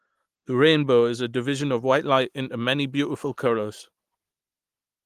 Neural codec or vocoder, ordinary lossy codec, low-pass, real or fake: none; Opus, 24 kbps; 14.4 kHz; real